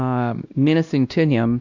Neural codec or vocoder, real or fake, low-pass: codec, 16 kHz, 1 kbps, X-Codec, WavLM features, trained on Multilingual LibriSpeech; fake; 7.2 kHz